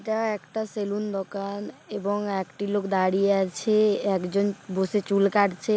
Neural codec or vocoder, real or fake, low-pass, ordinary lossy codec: none; real; none; none